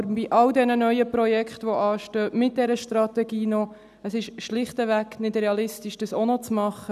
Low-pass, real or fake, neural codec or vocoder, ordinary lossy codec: 14.4 kHz; real; none; none